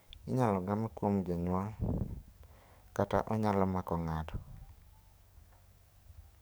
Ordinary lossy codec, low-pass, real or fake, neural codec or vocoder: none; none; fake; codec, 44.1 kHz, 7.8 kbps, DAC